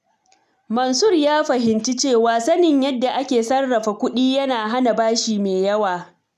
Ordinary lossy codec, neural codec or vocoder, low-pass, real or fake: none; none; 14.4 kHz; real